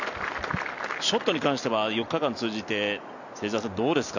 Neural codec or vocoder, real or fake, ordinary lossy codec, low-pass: none; real; none; 7.2 kHz